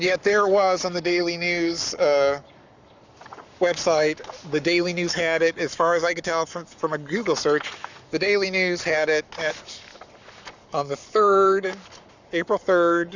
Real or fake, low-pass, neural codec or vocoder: fake; 7.2 kHz; codec, 44.1 kHz, 7.8 kbps, Pupu-Codec